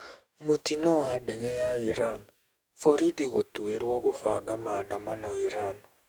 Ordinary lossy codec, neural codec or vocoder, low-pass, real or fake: none; codec, 44.1 kHz, 2.6 kbps, DAC; none; fake